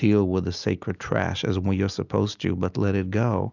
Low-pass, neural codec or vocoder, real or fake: 7.2 kHz; none; real